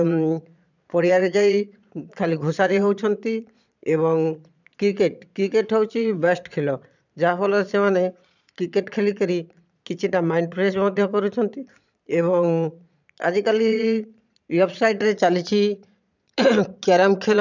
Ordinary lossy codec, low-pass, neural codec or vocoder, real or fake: none; 7.2 kHz; vocoder, 22.05 kHz, 80 mel bands, Vocos; fake